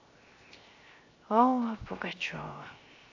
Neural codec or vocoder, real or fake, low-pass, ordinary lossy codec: codec, 16 kHz, 0.7 kbps, FocalCodec; fake; 7.2 kHz; AAC, 48 kbps